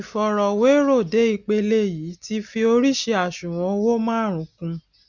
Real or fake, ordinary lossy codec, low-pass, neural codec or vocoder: real; none; 7.2 kHz; none